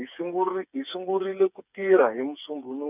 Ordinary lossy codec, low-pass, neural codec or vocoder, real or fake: none; 3.6 kHz; codec, 16 kHz, 4 kbps, FreqCodec, smaller model; fake